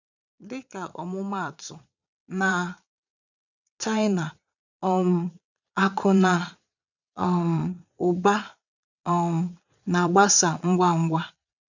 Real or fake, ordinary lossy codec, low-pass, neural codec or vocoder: fake; none; 7.2 kHz; vocoder, 22.05 kHz, 80 mel bands, Vocos